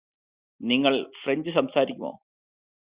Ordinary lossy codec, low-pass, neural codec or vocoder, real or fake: Opus, 64 kbps; 3.6 kHz; none; real